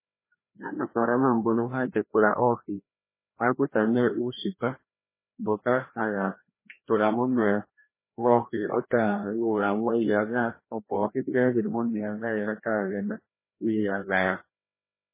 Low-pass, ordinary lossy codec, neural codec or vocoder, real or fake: 3.6 kHz; MP3, 16 kbps; codec, 16 kHz, 1 kbps, FreqCodec, larger model; fake